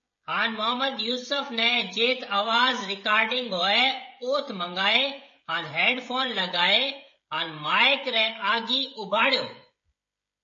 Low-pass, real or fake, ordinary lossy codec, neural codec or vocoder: 7.2 kHz; fake; MP3, 32 kbps; codec, 16 kHz, 16 kbps, FreqCodec, smaller model